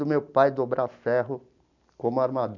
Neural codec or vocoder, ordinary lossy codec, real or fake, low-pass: none; none; real; 7.2 kHz